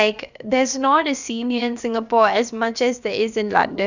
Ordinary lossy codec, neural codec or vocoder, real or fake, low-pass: none; codec, 16 kHz, about 1 kbps, DyCAST, with the encoder's durations; fake; 7.2 kHz